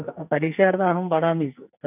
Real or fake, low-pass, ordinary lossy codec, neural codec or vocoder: fake; 3.6 kHz; none; codec, 44.1 kHz, 2.6 kbps, SNAC